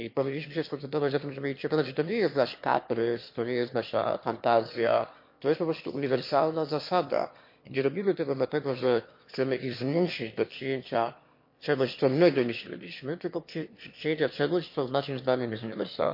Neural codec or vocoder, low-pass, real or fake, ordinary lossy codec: autoencoder, 22.05 kHz, a latent of 192 numbers a frame, VITS, trained on one speaker; 5.4 kHz; fake; MP3, 32 kbps